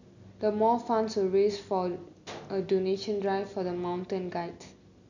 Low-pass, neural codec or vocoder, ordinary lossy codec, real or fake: 7.2 kHz; none; none; real